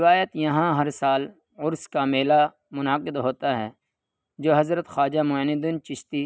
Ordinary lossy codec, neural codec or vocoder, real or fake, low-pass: none; none; real; none